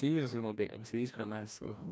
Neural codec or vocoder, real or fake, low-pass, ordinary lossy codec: codec, 16 kHz, 1 kbps, FreqCodec, larger model; fake; none; none